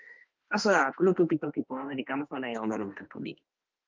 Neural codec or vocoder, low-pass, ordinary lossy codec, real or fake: autoencoder, 48 kHz, 32 numbers a frame, DAC-VAE, trained on Japanese speech; 7.2 kHz; Opus, 32 kbps; fake